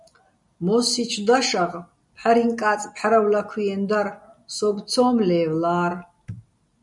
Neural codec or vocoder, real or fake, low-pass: none; real; 10.8 kHz